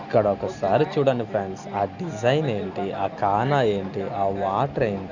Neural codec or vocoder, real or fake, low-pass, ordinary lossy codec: none; real; 7.2 kHz; Opus, 64 kbps